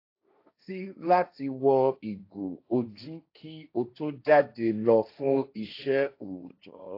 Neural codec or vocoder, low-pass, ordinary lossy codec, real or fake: codec, 16 kHz, 1.1 kbps, Voila-Tokenizer; 5.4 kHz; AAC, 32 kbps; fake